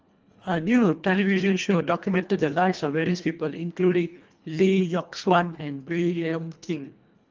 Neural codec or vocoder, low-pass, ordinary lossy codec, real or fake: codec, 24 kHz, 1.5 kbps, HILCodec; 7.2 kHz; Opus, 24 kbps; fake